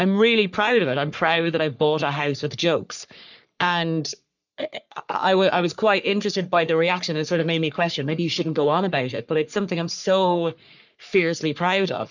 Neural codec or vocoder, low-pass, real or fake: codec, 44.1 kHz, 3.4 kbps, Pupu-Codec; 7.2 kHz; fake